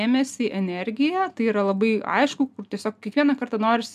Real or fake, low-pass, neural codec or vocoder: real; 14.4 kHz; none